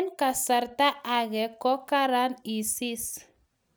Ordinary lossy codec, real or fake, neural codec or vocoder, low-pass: none; real; none; none